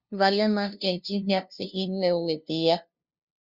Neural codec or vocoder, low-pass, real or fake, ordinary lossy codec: codec, 16 kHz, 0.5 kbps, FunCodec, trained on LibriTTS, 25 frames a second; 5.4 kHz; fake; Opus, 64 kbps